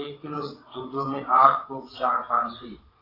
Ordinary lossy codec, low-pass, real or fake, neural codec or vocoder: AAC, 24 kbps; 5.4 kHz; fake; vocoder, 22.05 kHz, 80 mel bands, WaveNeXt